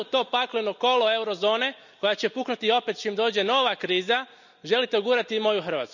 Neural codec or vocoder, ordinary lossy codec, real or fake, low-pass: none; none; real; 7.2 kHz